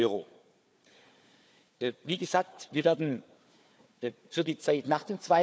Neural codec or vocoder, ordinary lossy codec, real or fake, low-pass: codec, 16 kHz, 4 kbps, FunCodec, trained on Chinese and English, 50 frames a second; none; fake; none